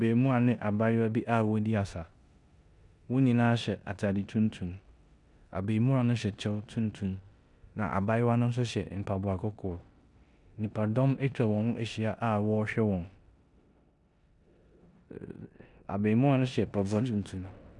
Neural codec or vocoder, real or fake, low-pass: codec, 16 kHz in and 24 kHz out, 0.9 kbps, LongCat-Audio-Codec, four codebook decoder; fake; 10.8 kHz